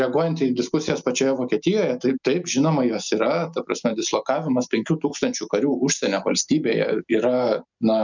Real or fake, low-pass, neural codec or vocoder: real; 7.2 kHz; none